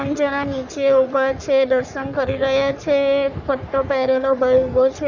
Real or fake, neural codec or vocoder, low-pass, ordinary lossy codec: fake; codec, 44.1 kHz, 3.4 kbps, Pupu-Codec; 7.2 kHz; none